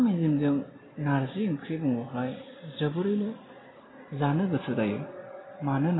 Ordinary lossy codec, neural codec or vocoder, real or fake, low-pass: AAC, 16 kbps; none; real; 7.2 kHz